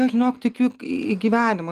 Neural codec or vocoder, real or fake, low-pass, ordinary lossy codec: none; real; 14.4 kHz; Opus, 32 kbps